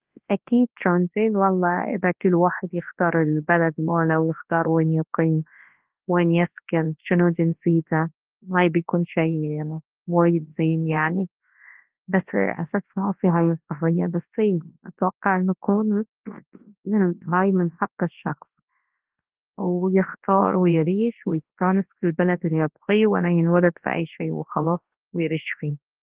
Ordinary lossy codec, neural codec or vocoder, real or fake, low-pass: Opus, 16 kbps; codec, 24 kHz, 0.9 kbps, WavTokenizer, large speech release; fake; 3.6 kHz